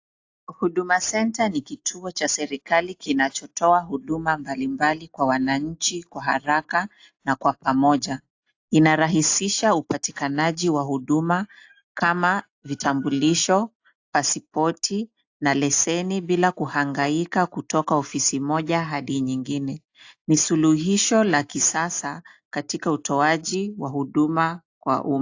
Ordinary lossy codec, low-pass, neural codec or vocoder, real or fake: AAC, 48 kbps; 7.2 kHz; none; real